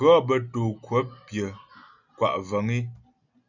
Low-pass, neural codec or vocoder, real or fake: 7.2 kHz; none; real